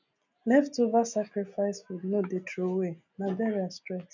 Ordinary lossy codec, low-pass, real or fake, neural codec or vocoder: none; 7.2 kHz; real; none